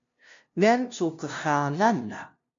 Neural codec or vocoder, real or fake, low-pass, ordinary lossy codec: codec, 16 kHz, 0.5 kbps, FunCodec, trained on LibriTTS, 25 frames a second; fake; 7.2 kHz; MP3, 48 kbps